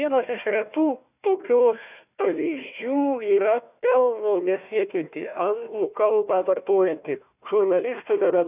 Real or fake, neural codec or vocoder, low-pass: fake; codec, 16 kHz, 1 kbps, FunCodec, trained on Chinese and English, 50 frames a second; 3.6 kHz